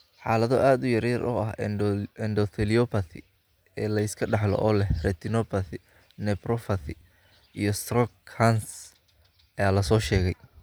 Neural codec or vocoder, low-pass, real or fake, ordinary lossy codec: none; none; real; none